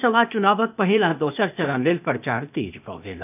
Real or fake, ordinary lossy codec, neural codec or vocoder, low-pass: fake; none; codec, 16 kHz, 0.8 kbps, ZipCodec; 3.6 kHz